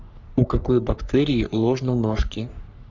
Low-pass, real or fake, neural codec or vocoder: 7.2 kHz; fake; codec, 44.1 kHz, 3.4 kbps, Pupu-Codec